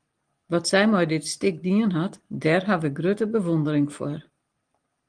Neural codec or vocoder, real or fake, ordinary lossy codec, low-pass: none; real; Opus, 24 kbps; 9.9 kHz